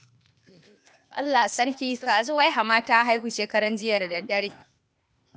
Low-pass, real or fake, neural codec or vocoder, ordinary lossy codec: none; fake; codec, 16 kHz, 0.8 kbps, ZipCodec; none